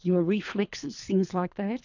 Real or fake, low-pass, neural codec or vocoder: fake; 7.2 kHz; codec, 24 kHz, 3 kbps, HILCodec